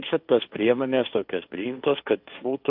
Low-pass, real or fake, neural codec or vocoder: 5.4 kHz; fake; codec, 16 kHz, 1.1 kbps, Voila-Tokenizer